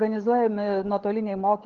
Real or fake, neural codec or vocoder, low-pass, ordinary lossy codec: fake; codec, 16 kHz, 16 kbps, FunCodec, trained on LibriTTS, 50 frames a second; 7.2 kHz; Opus, 16 kbps